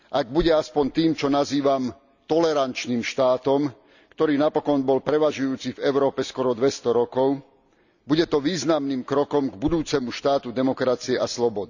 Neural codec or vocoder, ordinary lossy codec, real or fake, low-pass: none; none; real; 7.2 kHz